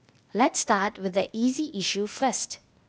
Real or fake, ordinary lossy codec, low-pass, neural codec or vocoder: fake; none; none; codec, 16 kHz, 0.8 kbps, ZipCodec